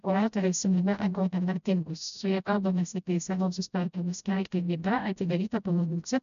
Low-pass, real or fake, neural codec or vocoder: 7.2 kHz; fake; codec, 16 kHz, 0.5 kbps, FreqCodec, smaller model